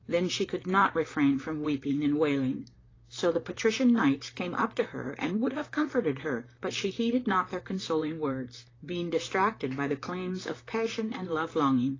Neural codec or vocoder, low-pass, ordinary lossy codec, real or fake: vocoder, 44.1 kHz, 128 mel bands, Pupu-Vocoder; 7.2 kHz; AAC, 32 kbps; fake